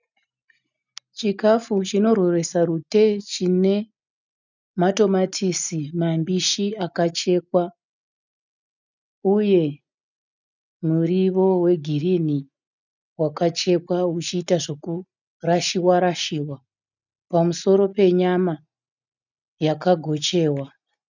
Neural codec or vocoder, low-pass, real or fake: none; 7.2 kHz; real